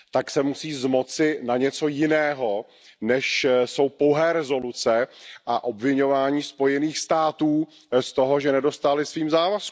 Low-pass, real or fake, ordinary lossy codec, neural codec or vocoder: none; real; none; none